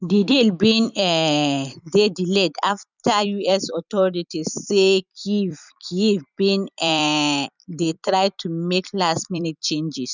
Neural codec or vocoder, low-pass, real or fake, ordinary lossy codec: vocoder, 44.1 kHz, 128 mel bands every 256 samples, BigVGAN v2; 7.2 kHz; fake; none